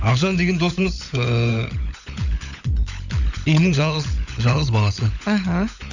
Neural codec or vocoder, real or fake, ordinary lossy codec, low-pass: codec, 16 kHz, 16 kbps, FunCodec, trained on LibriTTS, 50 frames a second; fake; none; 7.2 kHz